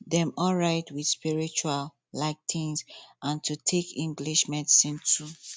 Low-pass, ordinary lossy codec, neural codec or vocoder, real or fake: none; none; none; real